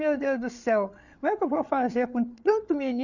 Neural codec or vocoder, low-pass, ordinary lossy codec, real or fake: codec, 16 kHz, 8 kbps, FreqCodec, larger model; 7.2 kHz; none; fake